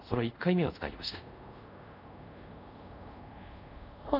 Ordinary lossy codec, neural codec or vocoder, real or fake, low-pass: none; codec, 24 kHz, 0.5 kbps, DualCodec; fake; 5.4 kHz